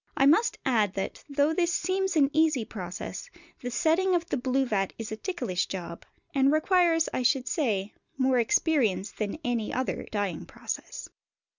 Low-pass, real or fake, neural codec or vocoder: 7.2 kHz; real; none